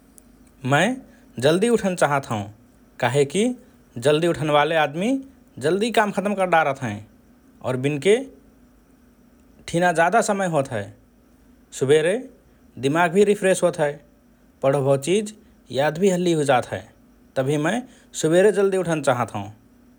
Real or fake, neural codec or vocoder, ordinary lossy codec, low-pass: real; none; none; none